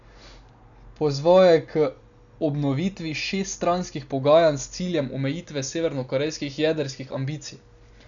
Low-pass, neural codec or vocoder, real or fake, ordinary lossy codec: 7.2 kHz; none; real; none